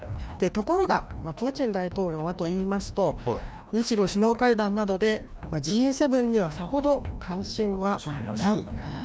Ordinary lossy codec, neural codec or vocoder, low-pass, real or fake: none; codec, 16 kHz, 1 kbps, FreqCodec, larger model; none; fake